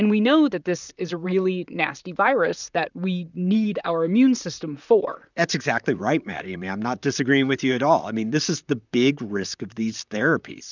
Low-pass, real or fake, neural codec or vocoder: 7.2 kHz; fake; vocoder, 44.1 kHz, 128 mel bands, Pupu-Vocoder